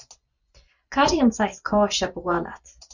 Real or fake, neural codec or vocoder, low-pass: fake; vocoder, 24 kHz, 100 mel bands, Vocos; 7.2 kHz